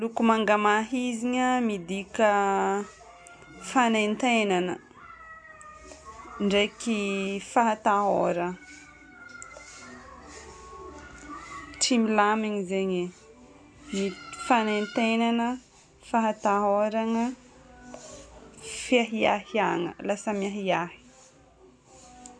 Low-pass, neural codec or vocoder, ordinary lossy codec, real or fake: 9.9 kHz; none; none; real